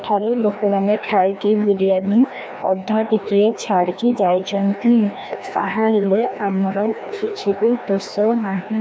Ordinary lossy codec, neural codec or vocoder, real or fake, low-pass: none; codec, 16 kHz, 1 kbps, FreqCodec, larger model; fake; none